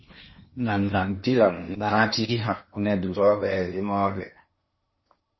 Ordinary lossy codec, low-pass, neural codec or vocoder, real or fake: MP3, 24 kbps; 7.2 kHz; codec, 16 kHz in and 24 kHz out, 0.8 kbps, FocalCodec, streaming, 65536 codes; fake